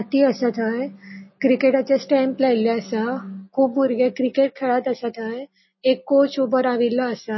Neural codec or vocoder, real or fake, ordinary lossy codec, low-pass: none; real; MP3, 24 kbps; 7.2 kHz